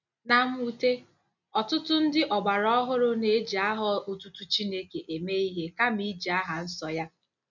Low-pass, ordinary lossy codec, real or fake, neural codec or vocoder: 7.2 kHz; none; real; none